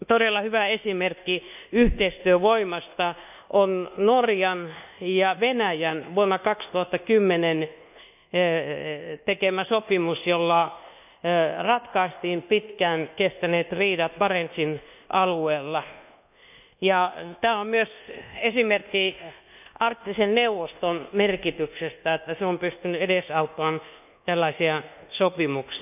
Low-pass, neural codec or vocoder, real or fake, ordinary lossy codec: 3.6 kHz; codec, 24 kHz, 1.2 kbps, DualCodec; fake; none